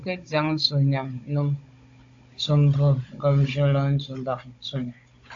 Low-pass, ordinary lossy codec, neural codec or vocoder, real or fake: 7.2 kHz; AAC, 48 kbps; codec, 16 kHz, 16 kbps, FunCodec, trained on Chinese and English, 50 frames a second; fake